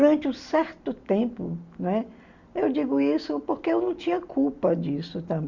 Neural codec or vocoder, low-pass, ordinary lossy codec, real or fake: none; 7.2 kHz; Opus, 64 kbps; real